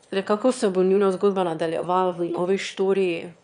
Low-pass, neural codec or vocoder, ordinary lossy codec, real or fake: 9.9 kHz; autoencoder, 22.05 kHz, a latent of 192 numbers a frame, VITS, trained on one speaker; none; fake